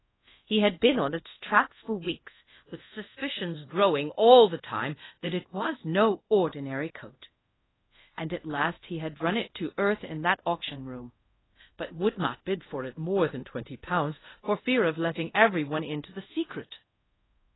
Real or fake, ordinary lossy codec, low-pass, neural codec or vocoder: fake; AAC, 16 kbps; 7.2 kHz; codec, 24 kHz, 0.5 kbps, DualCodec